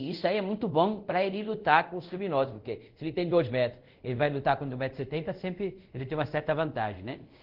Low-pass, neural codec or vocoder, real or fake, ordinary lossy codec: 5.4 kHz; codec, 24 kHz, 0.5 kbps, DualCodec; fake; Opus, 16 kbps